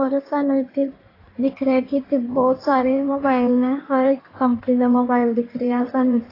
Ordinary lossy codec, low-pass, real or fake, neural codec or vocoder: AAC, 24 kbps; 5.4 kHz; fake; codec, 16 kHz in and 24 kHz out, 1.1 kbps, FireRedTTS-2 codec